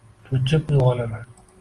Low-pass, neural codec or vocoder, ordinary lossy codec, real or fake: 10.8 kHz; none; Opus, 24 kbps; real